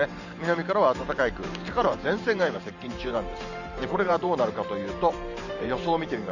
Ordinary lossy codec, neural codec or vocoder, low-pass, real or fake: none; vocoder, 44.1 kHz, 128 mel bands every 512 samples, BigVGAN v2; 7.2 kHz; fake